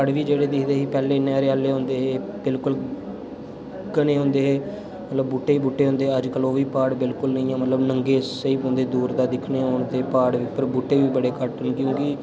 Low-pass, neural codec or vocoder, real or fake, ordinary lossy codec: none; none; real; none